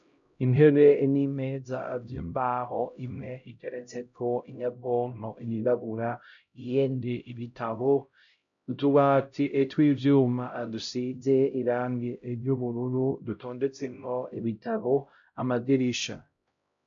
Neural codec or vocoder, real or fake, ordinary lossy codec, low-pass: codec, 16 kHz, 0.5 kbps, X-Codec, HuBERT features, trained on LibriSpeech; fake; MP3, 64 kbps; 7.2 kHz